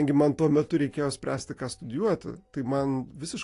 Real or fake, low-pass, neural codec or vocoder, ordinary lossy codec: real; 10.8 kHz; none; AAC, 48 kbps